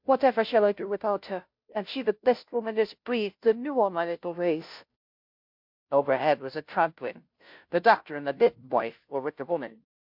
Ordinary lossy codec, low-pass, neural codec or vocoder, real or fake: MP3, 48 kbps; 5.4 kHz; codec, 16 kHz, 0.5 kbps, FunCodec, trained on Chinese and English, 25 frames a second; fake